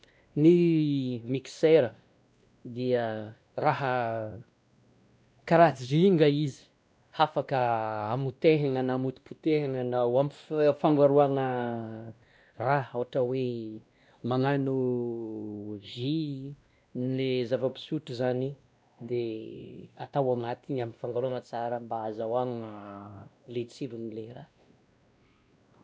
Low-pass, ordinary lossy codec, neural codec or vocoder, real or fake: none; none; codec, 16 kHz, 1 kbps, X-Codec, WavLM features, trained on Multilingual LibriSpeech; fake